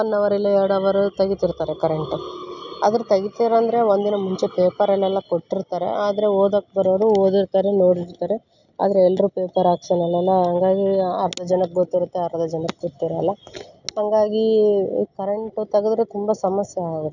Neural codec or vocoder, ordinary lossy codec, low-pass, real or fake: none; none; 7.2 kHz; real